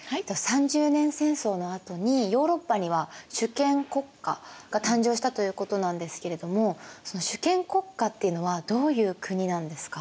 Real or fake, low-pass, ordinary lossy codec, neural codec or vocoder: real; none; none; none